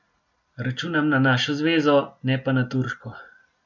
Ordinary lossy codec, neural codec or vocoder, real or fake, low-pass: none; none; real; 7.2 kHz